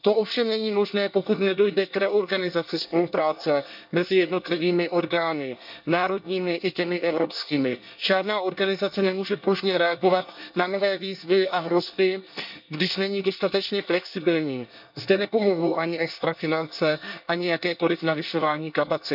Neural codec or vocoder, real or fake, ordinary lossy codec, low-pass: codec, 24 kHz, 1 kbps, SNAC; fake; none; 5.4 kHz